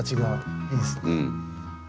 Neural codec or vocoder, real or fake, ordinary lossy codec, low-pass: none; real; none; none